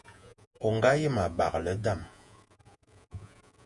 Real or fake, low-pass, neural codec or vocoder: fake; 10.8 kHz; vocoder, 48 kHz, 128 mel bands, Vocos